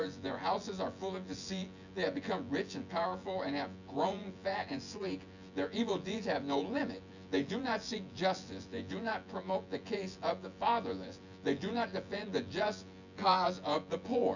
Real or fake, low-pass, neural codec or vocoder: fake; 7.2 kHz; vocoder, 24 kHz, 100 mel bands, Vocos